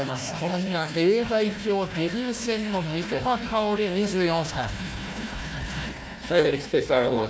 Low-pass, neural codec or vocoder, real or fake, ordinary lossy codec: none; codec, 16 kHz, 1 kbps, FunCodec, trained on Chinese and English, 50 frames a second; fake; none